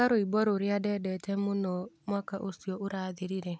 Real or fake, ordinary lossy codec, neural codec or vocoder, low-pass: real; none; none; none